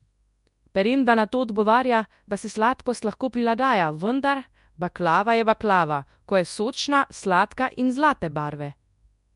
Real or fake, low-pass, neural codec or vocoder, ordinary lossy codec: fake; 10.8 kHz; codec, 24 kHz, 0.9 kbps, WavTokenizer, large speech release; MP3, 64 kbps